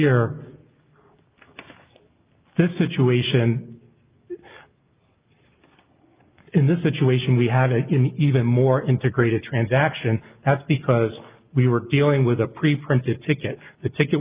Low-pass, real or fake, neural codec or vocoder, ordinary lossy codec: 3.6 kHz; real; none; Opus, 24 kbps